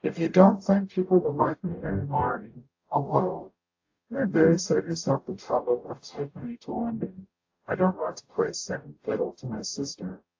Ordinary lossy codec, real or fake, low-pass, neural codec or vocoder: AAC, 48 kbps; fake; 7.2 kHz; codec, 44.1 kHz, 0.9 kbps, DAC